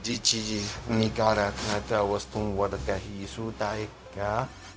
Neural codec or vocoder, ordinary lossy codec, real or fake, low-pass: codec, 16 kHz, 0.4 kbps, LongCat-Audio-Codec; none; fake; none